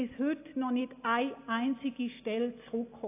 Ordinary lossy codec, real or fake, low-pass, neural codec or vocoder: AAC, 24 kbps; real; 3.6 kHz; none